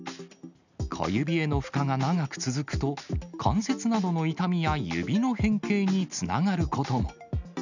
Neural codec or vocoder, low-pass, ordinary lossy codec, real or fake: none; 7.2 kHz; none; real